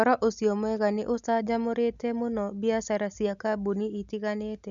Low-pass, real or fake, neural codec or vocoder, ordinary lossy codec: 7.2 kHz; real; none; none